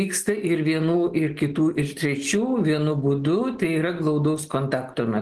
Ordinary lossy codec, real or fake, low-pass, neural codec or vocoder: Opus, 16 kbps; real; 10.8 kHz; none